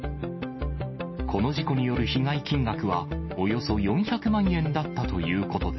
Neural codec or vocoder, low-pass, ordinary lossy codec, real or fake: none; 7.2 kHz; MP3, 24 kbps; real